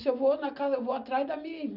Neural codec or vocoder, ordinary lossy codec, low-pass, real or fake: none; none; 5.4 kHz; real